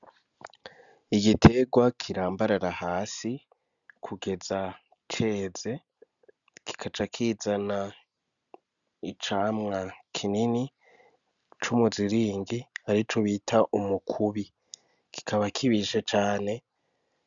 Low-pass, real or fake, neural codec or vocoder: 7.2 kHz; real; none